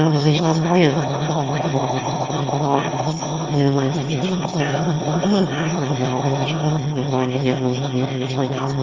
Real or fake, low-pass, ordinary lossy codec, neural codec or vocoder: fake; 7.2 kHz; Opus, 32 kbps; autoencoder, 22.05 kHz, a latent of 192 numbers a frame, VITS, trained on one speaker